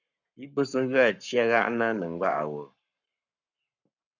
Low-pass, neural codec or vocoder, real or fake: 7.2 kHz; codec, 44.1 kHz, 7.8 kbps, Pupu-Codec; fake